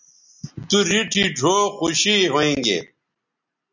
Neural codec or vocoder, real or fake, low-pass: none; real; 7.2 kHz